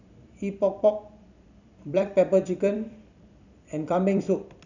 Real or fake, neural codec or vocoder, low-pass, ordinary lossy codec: fake; vocoder, 44.1 kHz, 128 mel bands every 256 samples, BigVGAN v2; 7.2 kHz; none